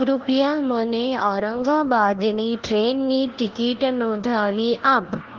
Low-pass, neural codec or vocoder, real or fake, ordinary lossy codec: 7.2 kHz; codec, 16 kHz, 1 kbps, FunCodec, trained on LibriTTS, 50 frames a second; fake; Opus, 16 kbps